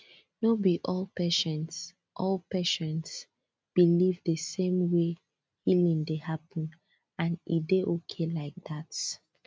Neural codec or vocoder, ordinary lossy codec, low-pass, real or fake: none; none; none; real